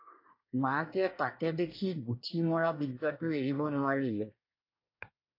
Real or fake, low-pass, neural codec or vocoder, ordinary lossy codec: fake; 5.4 kHz; codec, 24 kHz, 1 kbps, SNAC; AAC, 32 kbps